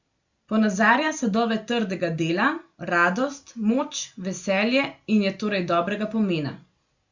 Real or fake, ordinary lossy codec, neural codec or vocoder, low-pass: real; Opus, 64 kbps; none; 7.2 kHz